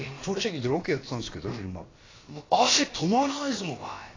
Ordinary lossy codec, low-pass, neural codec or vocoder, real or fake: AAC, 32 kbps; 7.2 kHz; codec, 16 kHz, about 1 kbps, DyCAST, with the encoder's durations; fake